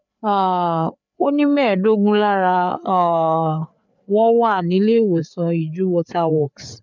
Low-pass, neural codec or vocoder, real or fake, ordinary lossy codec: 7.2 kHz; codec, 16 kHz, 4 kbps, FreqCodec, larger model; fake; none